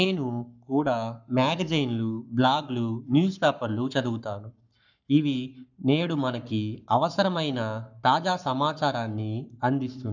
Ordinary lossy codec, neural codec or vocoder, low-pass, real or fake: none; codec, 44.1 kHz, 7.8 kbps, Pupu-Codec; 7.2 kHz; fake